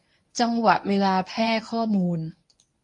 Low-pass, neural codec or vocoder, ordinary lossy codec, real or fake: 10.8 kHz; codec, 24 kHz, 0.9 kbps, WavTokenizer, medium speech release version 1; MP3, 48 kbps; fake